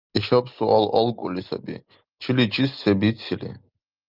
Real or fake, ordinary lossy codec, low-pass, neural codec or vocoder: real; Opus, 24 kbps; 5.4 kHz; none